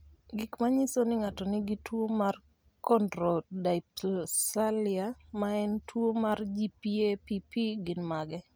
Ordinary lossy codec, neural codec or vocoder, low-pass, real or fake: none; none; none; real